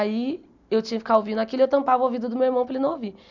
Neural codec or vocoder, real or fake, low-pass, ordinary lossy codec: none; real; 7.2 kHz; none